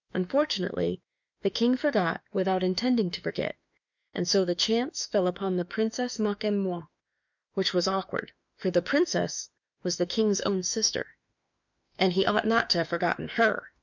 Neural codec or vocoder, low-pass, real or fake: autoencoder, 48 kHz, 32 numbers a frame, DAC-VAE, trained on Japanese speech; 7.2 kHz; fake